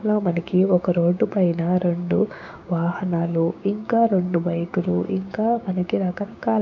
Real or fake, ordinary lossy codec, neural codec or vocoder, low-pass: fake; none; codec, 16 kHz, 6 kbps, DAC; 7.2 kHz